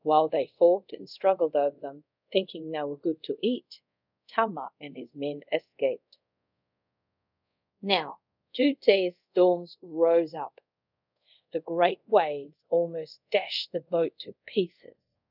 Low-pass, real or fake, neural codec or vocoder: 5.4 kHz; fake; codec, 24 kHz, 0.5 kbps, DualCodec